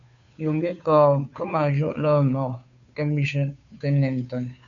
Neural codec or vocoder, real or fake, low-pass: codec, 16 kHz, 2 kbps, FunCodec, trained on Chinese and English, 25 frames a second; fake; 7.2 kHz